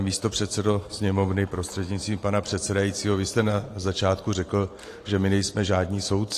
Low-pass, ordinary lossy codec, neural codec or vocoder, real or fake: 14.4 kHz; AAC, 48 kbps; vocoder, 44.1 kHz, 128 mel bands every 512 samples, BigVGAN v2; fake